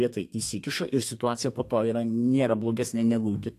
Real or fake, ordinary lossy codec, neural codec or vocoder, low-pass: fake; AAC, 64 kbps; codec, 32 kHz, 1.9 kbps, SNAC; 14.4 kHz